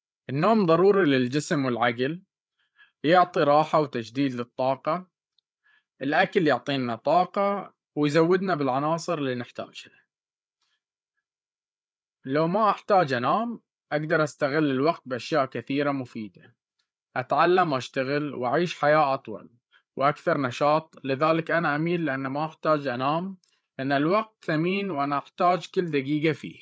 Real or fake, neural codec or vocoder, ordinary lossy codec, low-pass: fake; codec, 16 kHz, 8 kbps, FreqCodec, larger model; none; none